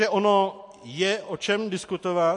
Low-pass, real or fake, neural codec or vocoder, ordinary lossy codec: 10.8 kHz; real; none; MP3, 48 kbps